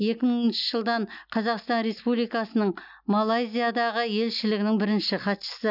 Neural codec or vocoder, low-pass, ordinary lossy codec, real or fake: none; 5.4 kHz; none; real